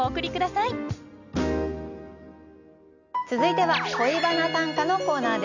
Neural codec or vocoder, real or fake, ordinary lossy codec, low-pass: none; real; none; 7.2 kHz